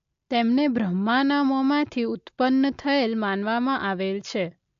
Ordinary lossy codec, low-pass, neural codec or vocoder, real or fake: AAC, 64 kbps; 7.2 kHz; none; real